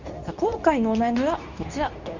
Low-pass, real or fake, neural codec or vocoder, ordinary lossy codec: 7.2 kHz; fake; codec, 24 kHz, 0.9 kbps, WavTokenizer, medium speech release version 2; Opus, 64 kbps